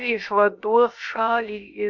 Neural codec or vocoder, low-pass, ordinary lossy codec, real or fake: codec, 16 kHz, about 1 kbps, DyCAST, with the encoder's durations; 7.2 kHz; AAC, 48 kbps; fake